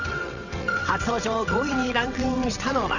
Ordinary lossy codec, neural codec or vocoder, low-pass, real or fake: none; vocoder, 22.05 kHz, 80 mel bands, WaveNeXt; 7.2 kHz; fake